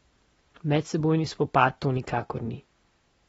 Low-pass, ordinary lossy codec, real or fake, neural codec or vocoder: 19.8 kHz; AAC, 24 kbps; real; none